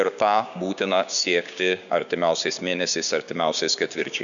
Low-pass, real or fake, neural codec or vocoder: 7.2 kHz; fake; codec, 16 kHz, 6 kbps, DAC